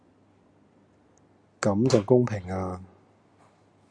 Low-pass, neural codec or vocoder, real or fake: 9.9 kHz; none; real